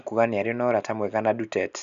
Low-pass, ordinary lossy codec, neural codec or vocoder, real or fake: 7.2 kHz; none; none; real